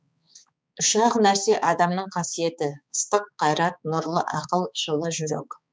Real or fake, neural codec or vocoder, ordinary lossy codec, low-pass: fake; codec, 16 kHz, 4 kbps, X-Codec, HuBERT features, trained on balanced general audio; none; none